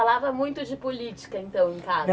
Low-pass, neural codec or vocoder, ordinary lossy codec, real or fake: none; none; none; real